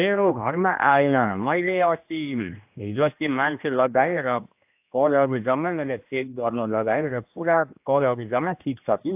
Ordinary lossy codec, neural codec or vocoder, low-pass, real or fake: AAC, 32 kbps; codec, 16 kHz, 1 kbps, X-Codec, HuBERT features, trained on general audio; 3.6 kHz; fake